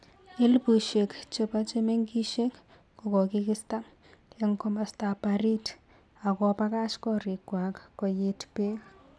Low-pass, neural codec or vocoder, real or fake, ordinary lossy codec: none; none; real; none